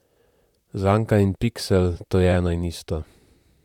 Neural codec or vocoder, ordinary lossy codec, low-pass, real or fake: vocoder, 44.1 kHz, 128 mel bands, Pupu-Vocoder; none; 19.8 kHz; fake